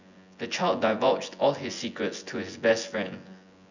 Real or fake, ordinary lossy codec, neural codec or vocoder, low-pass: fake; none; vocoder, 24 kHz, 100 mel bands, Vocos; 7.2 kHz